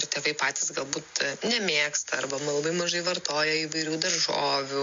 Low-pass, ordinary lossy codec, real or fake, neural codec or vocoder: 7.2 kHz; MP3, 48 kbps; real; none